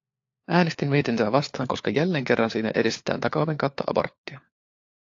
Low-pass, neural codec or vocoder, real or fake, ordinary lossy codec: 7.2 kHz; codec, 16 kHz, 4 kbps, FunCodec, trained on LibriTTS, 50 frames a second; fake; AAC, 48 kbps